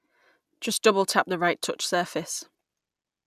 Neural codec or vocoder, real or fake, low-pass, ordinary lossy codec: none; real; 14.4 kHz; none